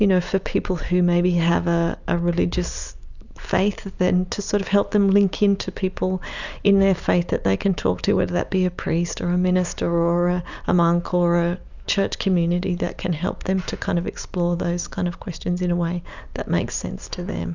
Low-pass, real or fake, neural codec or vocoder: 7.2 kHz; real; none